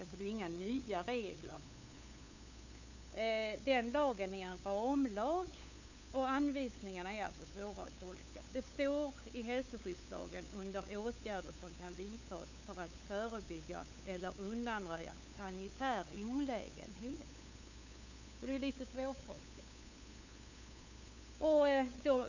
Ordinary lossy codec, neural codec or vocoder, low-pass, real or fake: none; codec, 16 kHz, 8 kbps, FunCodec, trained on LibriTTS, 25 frames a second; 7.2 kHz; fake